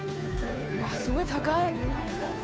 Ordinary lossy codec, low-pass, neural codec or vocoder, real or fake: none; none; codec, 16 kHz, 2 kbps, FunCodec, trained on Chinese and English, 25 frames a second; fake